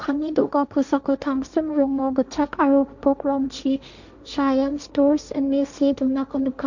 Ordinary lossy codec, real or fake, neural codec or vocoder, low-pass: none; fake; codec, 16 kHz, 1.1 kbps, Voila-Tokenizer; 7.2 kHz